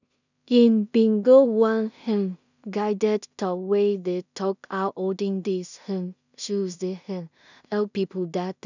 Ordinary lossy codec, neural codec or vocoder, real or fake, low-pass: none; codec, 16 kHz in and 24 kHz out, 0.4 kbps, LongCat-Audio-Codec, two codebook decoder; fake; 7.2 kHz